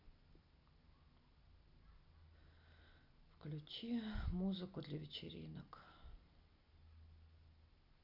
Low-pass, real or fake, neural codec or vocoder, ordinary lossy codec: 5.4 kHz; real; none; none